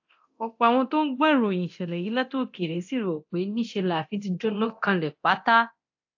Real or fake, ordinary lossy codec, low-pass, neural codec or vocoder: fake; AAC, 48 kbps; 7.2 kHz; codec, 24 kHz, 0.9 kbps, DualCodec